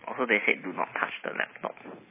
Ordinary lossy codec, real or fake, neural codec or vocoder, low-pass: MP3, 16 kbps; real; none; 3.6 kHz